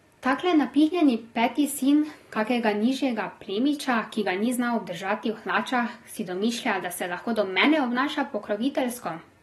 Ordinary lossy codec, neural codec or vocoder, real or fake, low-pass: AAC, 32 kbps; none; real; 19.8 kHz